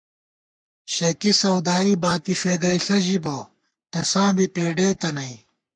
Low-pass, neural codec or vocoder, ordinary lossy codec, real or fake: 9.9 kHz; codec, 44.1 kHz, 3.4 kbps, Pupu-Codec; MP3, 64 kbps; fake